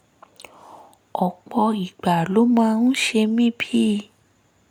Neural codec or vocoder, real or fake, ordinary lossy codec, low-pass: none; real; none; 19.8 kHz